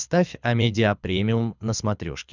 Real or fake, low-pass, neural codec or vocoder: fake; 7.2 kHz; codec, 24 kHz, 6 kbps, HILCodec